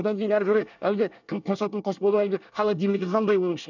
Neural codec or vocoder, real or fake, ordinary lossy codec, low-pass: codec, 24 kHz, 1 kbps, SNAC; fake; none; 7.2 kHz